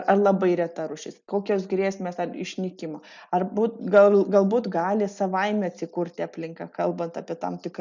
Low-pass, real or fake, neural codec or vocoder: 7.2 kHz; real; none